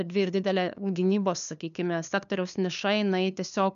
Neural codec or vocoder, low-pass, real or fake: codec, 16 kHz, 2 kbps, FunCodec, trained on LibriTTS, 25 frames a second; 7.2 kHz; fake